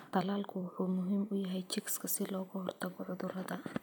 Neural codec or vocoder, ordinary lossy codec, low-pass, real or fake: vocoder, 44.1 kHz, 128 mel bands every 256 samples, BigVGAN v2; none; none; fake